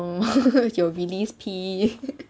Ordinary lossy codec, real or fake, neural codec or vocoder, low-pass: none; real; none; none